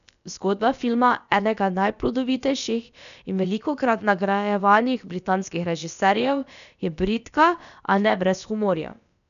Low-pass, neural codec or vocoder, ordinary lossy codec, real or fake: 7.2 kHz; codec, 16 kHz, about 1 kbps, DyCAST, with the encoder's durations; none; fake